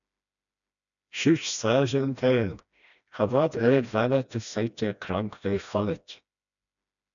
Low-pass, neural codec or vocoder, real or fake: 7.2 kHz; codec, 16 kHz, 1 kbps, FreqCodec, smaller model; fake